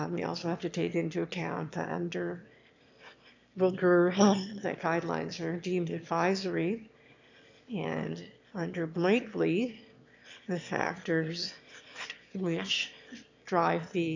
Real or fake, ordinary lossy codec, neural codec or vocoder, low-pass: fake; MP3, 64 kbps; autoencoder, 22.05 kHz, a latent of 192 numbers a frame, VITS, trained on one speaker; 7.2 kHz